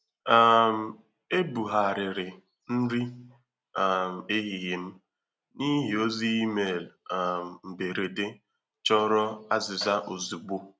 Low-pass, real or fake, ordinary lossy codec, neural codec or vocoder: none; real; none; none